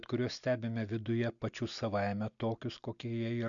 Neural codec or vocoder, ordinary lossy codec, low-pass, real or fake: none; MP3, 64 kbps; 7.2 kHz; real